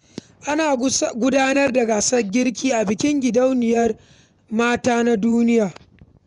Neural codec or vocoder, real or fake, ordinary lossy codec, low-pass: vocoder, 24 kHz, 100 mel bands, Vocos; fake; none; 10.8 kHz